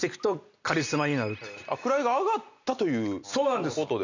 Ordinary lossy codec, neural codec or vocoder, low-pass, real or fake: none; none; 7.2 kHz; real